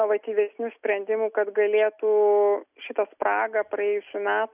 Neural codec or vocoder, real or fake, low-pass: none; real; 3.6 kHz